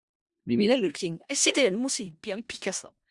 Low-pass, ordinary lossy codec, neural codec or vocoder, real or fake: 10.8 kHz; Opus, 64 kbps; codec, 16 kHz in and 24 kHz out, 0.4 kbps, LongCat-Audio-Codec, four codebook decoder; fake